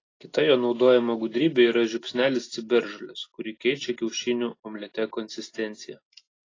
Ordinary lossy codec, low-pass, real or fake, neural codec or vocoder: AAC, 32 kbps; 7.2 kHz; real; none